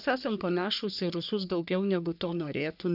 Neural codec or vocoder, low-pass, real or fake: codec, 44.1 kHz, 3.4 kbps, Pupu-Codec; 5.4 kHz; fake